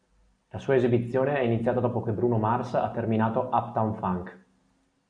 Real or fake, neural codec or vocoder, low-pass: real; none; 9.9 kHz